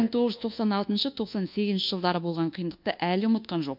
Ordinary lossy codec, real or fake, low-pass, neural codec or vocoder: MP3, 48 kbps; fake; 5.4 kHz; codec, 24 kHz, 1.2 kbps, DualCodec